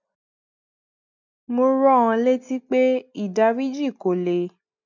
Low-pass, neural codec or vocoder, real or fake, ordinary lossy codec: 7.2 kHz; none; real; none